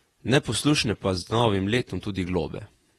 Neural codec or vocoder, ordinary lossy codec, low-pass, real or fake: vocoder, 48 kHz, 128 mel bands, Vocos; AAC, 32 kbps; 19.8 kHz; fake